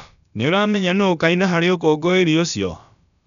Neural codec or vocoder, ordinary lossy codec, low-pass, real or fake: codec, 16 kHz, about 1 kbps, DyCAST, with the encoder's durations; none; 7.2 kHz; fake